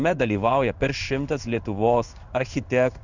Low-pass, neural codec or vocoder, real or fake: 7.2 kHz; codec, 16 kHz in and 24 kHz out, 1 kbps, XY-Tokenizer; fake